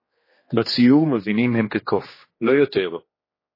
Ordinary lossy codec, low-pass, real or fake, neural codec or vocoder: MP3, 24 kbps; 5.4 kHz; fake; codec, 16 kHz, 2 kbps, X-Codec, HuBERT features, trained on general audio